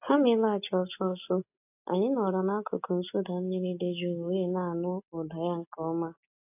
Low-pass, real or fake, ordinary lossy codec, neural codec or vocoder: 3.6 kHz; real; AAC, 32 kbps; none